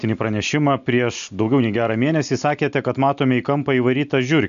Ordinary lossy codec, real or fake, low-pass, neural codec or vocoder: MP3, 96 kbps; real; 7.2 kHz; none